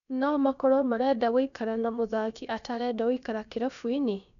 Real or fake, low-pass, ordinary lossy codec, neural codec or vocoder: fake; 7.2 kHz; none; codec, 16 kHz, about 1 kbps, DyCAST, with the encoder's durations